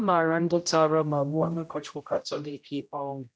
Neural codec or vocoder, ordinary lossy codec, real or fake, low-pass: codec, 16 kHz, 0.5 kbps, X-Codec, HuBERT features, trained on general audio; none; fake; none